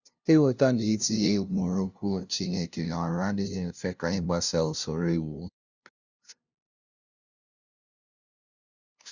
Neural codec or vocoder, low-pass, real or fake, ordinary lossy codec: codec, 16 kHz, 0.5 kbps, FunCodec, trained on LibriTTS, 25 frames a second; 7.2 kHz; fake; Opus, 64 kbps